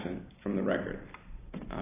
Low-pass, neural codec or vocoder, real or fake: 3.6 kHz; none; real